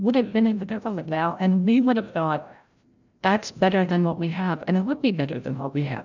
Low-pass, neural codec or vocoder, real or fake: 7.2 kHz; codec, 16 kHz, 0.5 kbps, FreqCodec, larger model; fake